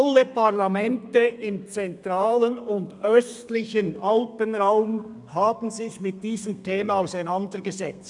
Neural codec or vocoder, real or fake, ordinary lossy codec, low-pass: codec, 32 kHz, 1.9 kbps, SNAC; fake; none; 10.8 kHz